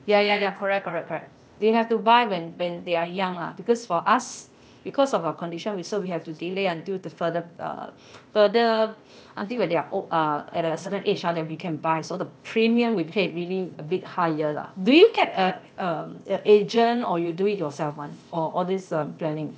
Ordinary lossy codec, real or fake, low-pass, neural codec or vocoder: none; fake; none; codec, 16 kHz, 0.8 kbps, ZipCodec